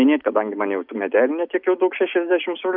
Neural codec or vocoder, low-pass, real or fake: none; 5.4 kHz; real